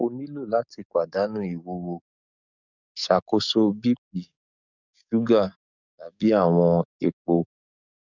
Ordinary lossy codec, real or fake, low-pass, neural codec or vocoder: none; fake; 7.2 kHz; codec, 44.1 kHz, 7.8 kbps, DAC